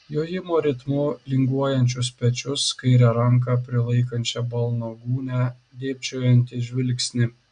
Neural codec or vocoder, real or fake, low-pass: none; real; 9.9 kHz